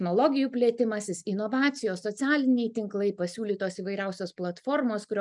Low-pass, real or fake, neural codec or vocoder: 10.8 kHz; real; none